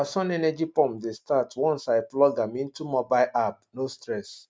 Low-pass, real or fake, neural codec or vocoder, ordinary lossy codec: none; real; none; none